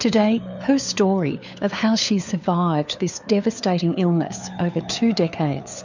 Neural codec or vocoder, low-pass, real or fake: codec, 16 kHz, 4 kbps, FunCodec, trained on LibriTTS, 50 frames a second; 7.2 kHz; fake